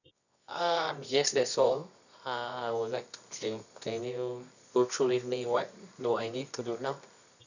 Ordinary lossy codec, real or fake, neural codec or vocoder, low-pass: none; fake; codec, 24 kHz, 0.9 kbps, WavTokenizer, medium music audio release; 7.2 kHz